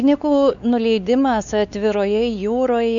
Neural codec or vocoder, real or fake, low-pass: codec, 16 kHz, 4 kbps, X-Codec, WavLM features, trained on Multilingual LibriSpeech; fake; 7.2 kHz